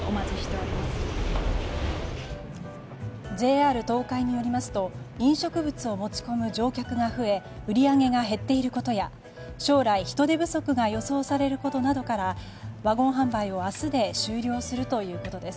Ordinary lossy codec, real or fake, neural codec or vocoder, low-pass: none; real; none; none